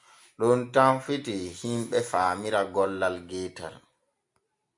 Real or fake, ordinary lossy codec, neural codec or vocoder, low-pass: real; MP3, 96 kbps; none; 10.8 kHz